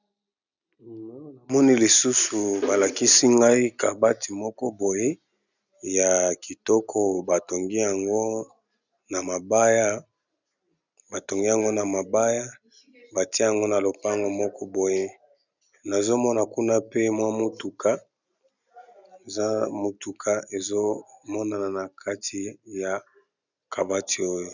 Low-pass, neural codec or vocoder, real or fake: 7.2 kHz; none; real